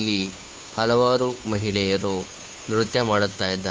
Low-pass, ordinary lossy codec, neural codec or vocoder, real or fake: none; none; codec, 16 kHz, 2 kbps, FunCodec, trained on Chinese and English, 25 frames a second; fake